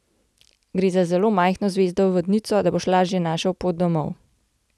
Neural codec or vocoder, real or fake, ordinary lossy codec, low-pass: none; real; none; none